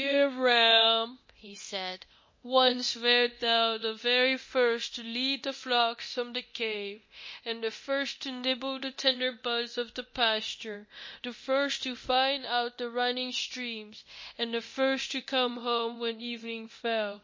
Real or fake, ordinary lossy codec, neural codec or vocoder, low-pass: fake; MP3, 32 kbps; codec, 24 kHz, 0.9 kbps, DualCodec; 7.2 kHz